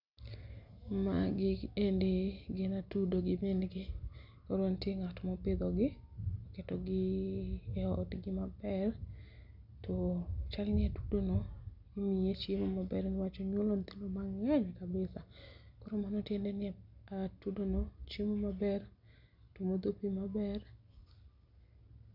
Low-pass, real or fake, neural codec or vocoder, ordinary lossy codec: 5.4 kHz; real; none; none